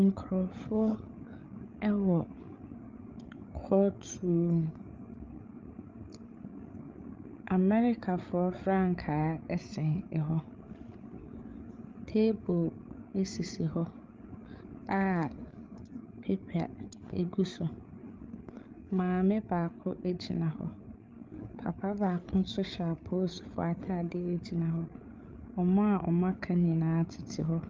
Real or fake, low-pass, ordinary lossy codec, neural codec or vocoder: fake; 7.2 kHz; Opus, 24 kbps; codec, 16 kHz, 8 kbps, FreqCodec, larger model